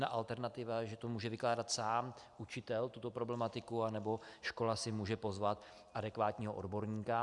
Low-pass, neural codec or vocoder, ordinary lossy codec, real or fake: 10.8 kHz; none; Opus, 64 kbps; real